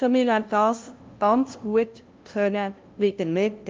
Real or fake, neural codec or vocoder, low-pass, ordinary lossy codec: fake; codec, 16 kHz, 0.5 kbps, FunCodec, trained on LibriTTS, 25 frames a second; 7.2 kHz; Opus, 32 kbps